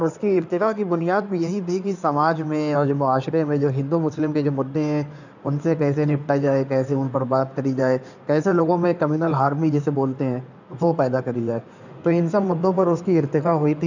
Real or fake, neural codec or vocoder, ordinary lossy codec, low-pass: fake; codec, 16 kHz in and 24 kHz out, 2.2 kbps, FireRedTTS-2 codec; none; 7.2 kHz